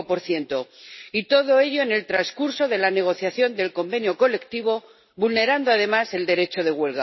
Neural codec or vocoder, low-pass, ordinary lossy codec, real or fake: none; 7.2 kHz; MP3, 24 kbps; real